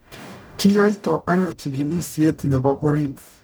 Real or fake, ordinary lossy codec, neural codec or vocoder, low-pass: fake; none; codec, 44.1 kHz, 0.9 kbps, DAC; none